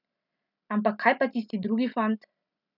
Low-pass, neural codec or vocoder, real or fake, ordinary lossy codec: 5.4 kHz; none; real; none